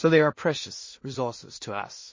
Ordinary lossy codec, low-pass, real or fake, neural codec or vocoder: MP3, 32 kbps; 7.2 kHz; fake; codec, 16 kHz in and 24 kHz out, 0.4 kbps, LongCat-Audio-Codec, two codebook decoder